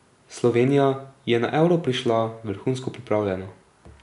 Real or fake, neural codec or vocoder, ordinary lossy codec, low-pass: real; none; none; 10.8 kHz